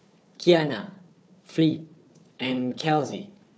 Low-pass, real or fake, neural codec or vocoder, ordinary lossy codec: none; fake; codec, 16 kHz, 4 kbps, FunCodec, trained on Chinese and English, 50 frames a second; none